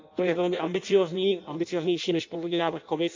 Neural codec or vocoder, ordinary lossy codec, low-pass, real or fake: codec, 16 kHz in and 24 kHz out, 0.6 kbps, FireRedTTS-2 codec; MP3, 48 kbps; 7.2 kHz; fake